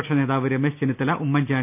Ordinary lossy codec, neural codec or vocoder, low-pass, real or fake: none; none; 3.6 kHz; real